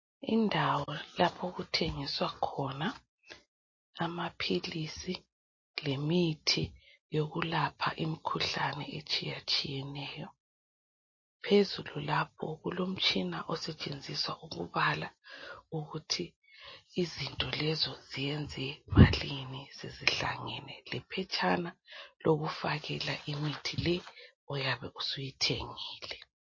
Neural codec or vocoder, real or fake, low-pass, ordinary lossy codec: none; real; 7.2 kHz; MP3, 32 kbps